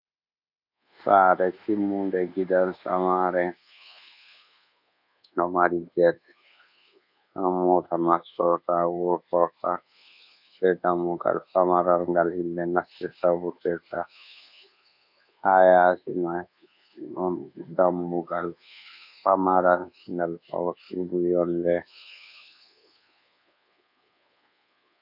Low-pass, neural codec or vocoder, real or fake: 5.4 kHz; codec, 24 kHz, 1.2 kbps, DualCodec; fake